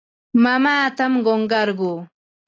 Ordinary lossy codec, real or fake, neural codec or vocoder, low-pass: AAC, 32 kbps; real; none; 7.2 kHz